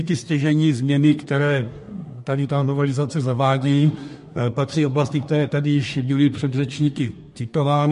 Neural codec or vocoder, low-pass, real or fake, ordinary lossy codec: codec, 24 kHz, 1 kbps, SNAC; 10.8 kHz; fake; MP3, 48 kbps